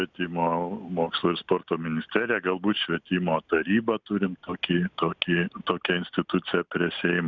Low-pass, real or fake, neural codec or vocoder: 7.2 kHz; real; none